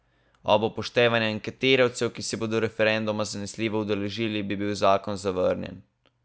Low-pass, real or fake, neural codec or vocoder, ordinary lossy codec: none; real; none; none